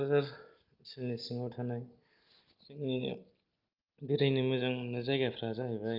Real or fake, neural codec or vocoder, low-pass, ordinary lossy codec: real; none; 5.4 kHz; Opus, 24 kbps